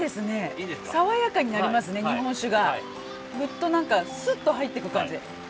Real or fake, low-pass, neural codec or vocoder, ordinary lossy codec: real; none; none; none